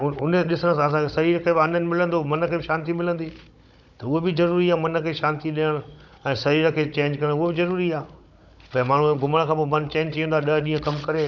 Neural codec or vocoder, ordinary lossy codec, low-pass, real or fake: codec, 16 kHz, 16 kbps, FunCodec, trained on Chinese and English, 50 frames a second; none; 7.2 kHz; fake